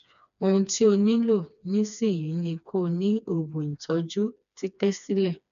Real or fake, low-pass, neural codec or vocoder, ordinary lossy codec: fake; 7.2 kHz; codec, 16 kHz, 2 kbps, FreqCodec, smaller model; none